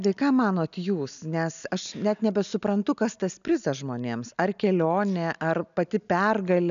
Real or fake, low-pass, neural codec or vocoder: real; 7.2 kHz; none